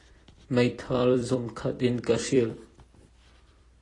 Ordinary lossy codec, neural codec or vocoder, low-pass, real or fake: AAC, 32 kbps; vocoder, 44.1 kHz, 128 mel bands every 256 samples, BigVGAN v2; 10.8 kHz; fake